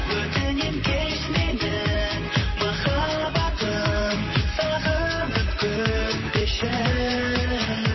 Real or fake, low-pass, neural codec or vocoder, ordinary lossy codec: fake; 7.2 kHz; vocoder, 44.1 kHz, 128 mel bands, Pupu-Vocoder; MP3, 24 kbps